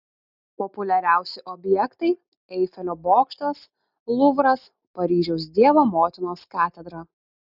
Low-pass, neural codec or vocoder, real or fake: 5.4 kHz; none; real